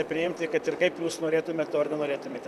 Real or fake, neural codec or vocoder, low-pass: fake; vocoder, 44.1 kHz, 128 mel bands, Pupu-Vocoder; 14.4 kHz